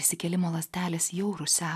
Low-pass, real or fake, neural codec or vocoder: 14.4 kHz; real; none